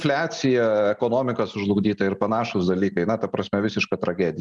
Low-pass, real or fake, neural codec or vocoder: 10.8 kHz; real; none